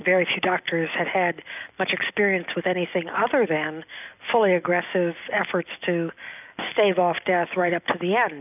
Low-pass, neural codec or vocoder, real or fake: 3.6 kHz; none; real